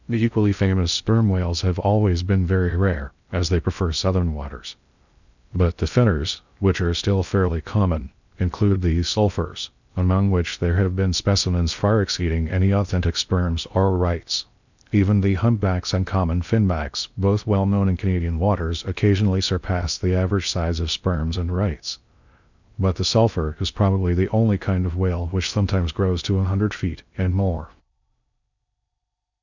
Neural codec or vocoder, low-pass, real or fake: codec, 16 kHz in and 24 kHz out, 0.6 kbps, FocalCodec, streaming, 2048 codes; 7.2 kHz; fake